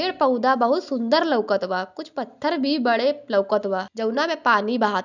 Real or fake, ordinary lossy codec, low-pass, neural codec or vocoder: real; none; 7.2 kHz; none